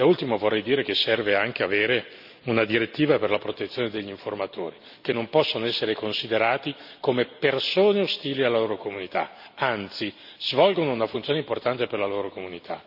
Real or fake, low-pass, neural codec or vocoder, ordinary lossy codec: real; 5.4 kHz; none; none